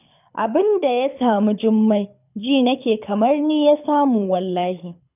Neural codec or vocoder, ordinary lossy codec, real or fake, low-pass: codec, 24 kHz, 6 kbps, HILCodec; none; fake; 3.6 kHz